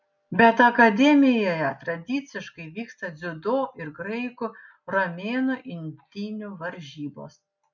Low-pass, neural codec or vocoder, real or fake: 7.2 kHz; none; real